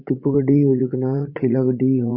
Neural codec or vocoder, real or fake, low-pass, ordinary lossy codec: vocoder, 44.1 kHz, 80 mel bands, Vocos; fake; 5.4 kHz; Opus, 64 kbps